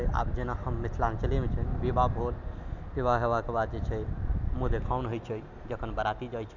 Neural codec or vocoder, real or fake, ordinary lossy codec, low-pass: none; real; none; 7.2 kHz